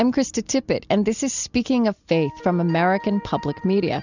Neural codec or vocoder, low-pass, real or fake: none; 7.2 kHz; real